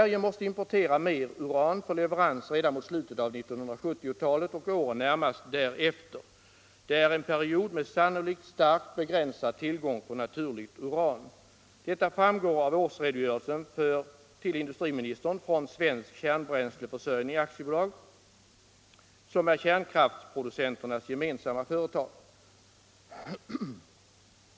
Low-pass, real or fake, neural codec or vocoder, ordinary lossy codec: none; real; none; none